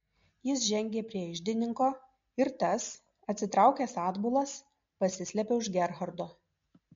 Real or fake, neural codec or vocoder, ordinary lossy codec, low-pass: real; none; MP3, 48 kbps; 7.2 kHz